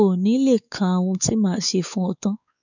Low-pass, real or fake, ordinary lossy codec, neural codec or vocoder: 7.2 kHz; fake; none; codec, 16 kHz, 4 kbps, X-Codec, WavLM features, trained on Multilingual LibriSpeech